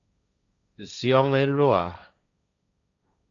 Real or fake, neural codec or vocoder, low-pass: fake; codec, 16 kHz, 1.1 kbps, Voila-Tokenizer; 7.2 kHz